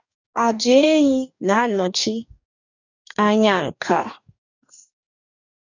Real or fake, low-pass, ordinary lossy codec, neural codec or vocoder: fake; 7.2 kHz; none; codec, 44.1 kHz, 2.6 kbps, DAC